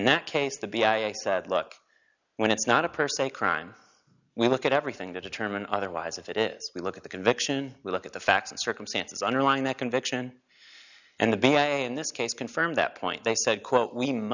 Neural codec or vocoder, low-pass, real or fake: none; 7.2 kHz; real